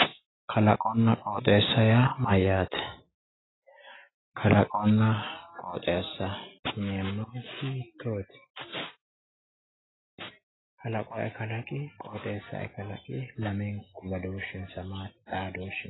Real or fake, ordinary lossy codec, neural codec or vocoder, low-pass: real; AAC, 16 kbps; none; 7.2 kHz